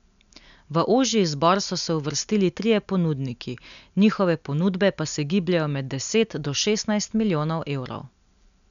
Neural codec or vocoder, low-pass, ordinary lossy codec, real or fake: none; 7.2 kHz; none; real